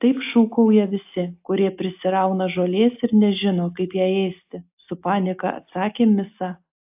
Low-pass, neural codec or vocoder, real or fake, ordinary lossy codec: 3.6 kHz; none; real; AAC, 32 kbps